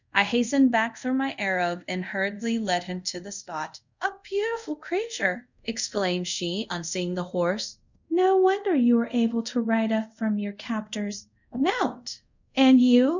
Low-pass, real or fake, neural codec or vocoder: 7.2 kHz; fake; codec, 24 kHz, 0.5 kbps, DualCodec